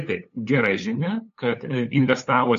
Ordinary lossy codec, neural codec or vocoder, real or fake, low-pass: AAC, 48 kbps; codec, 16 kHz, 8 kbps, FunCodec, trained on LibriTTS, 25 frames a second; fake; 7.2 kHz